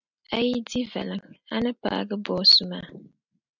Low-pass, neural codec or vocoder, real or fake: 7.2 kHz; none; real